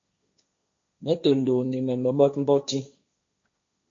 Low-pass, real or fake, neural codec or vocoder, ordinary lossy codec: 7.2 kHz; fake; codec, 16 kHz, 1.1 kbps, Voila-Tokenizer; MP3, 48 kbps